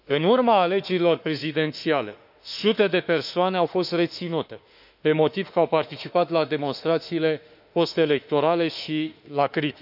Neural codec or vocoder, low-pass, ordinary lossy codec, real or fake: autoencoder, 48 kHz, 32 numbers a frame, DAC-VAE, trained on Japanese speech; 5.4 kHz; none; fake